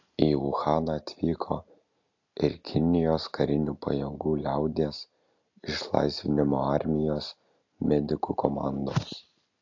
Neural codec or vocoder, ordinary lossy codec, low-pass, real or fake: none; AAC, 48 kbps; 7.2 kHz; real